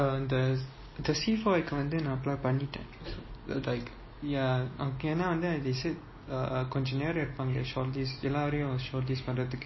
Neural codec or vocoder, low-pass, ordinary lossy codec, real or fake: none; 7.2 kHz; MP3, 24 kbps; real